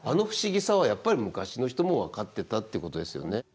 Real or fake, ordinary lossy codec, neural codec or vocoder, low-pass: real; none; none; none